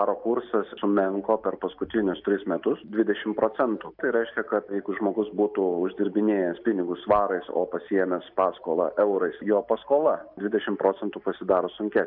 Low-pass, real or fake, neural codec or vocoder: 5.4 kHz; real; none